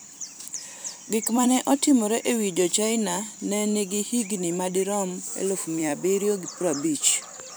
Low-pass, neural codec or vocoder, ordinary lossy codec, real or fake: none; vocoder, 44.1 kHz, 128 mel bands every 256 samples, BigVGAN v2; none; fake